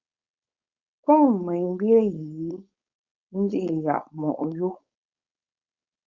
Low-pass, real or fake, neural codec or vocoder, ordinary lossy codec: 7.2 kHz; fake; codec, 16 kHz, 4.8 kbps, FACodec; Opus, 64 kbps